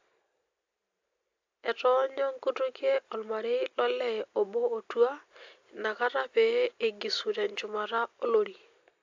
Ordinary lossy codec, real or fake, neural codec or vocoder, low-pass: AAC, 48 kbps; real; none; 7.2 kHz